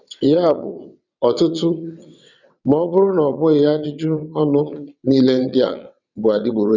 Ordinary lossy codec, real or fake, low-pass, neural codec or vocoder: none; fake; 7.2 kHz; vocoder, 22.05 kHz, 80 mel bands, WaveNeXt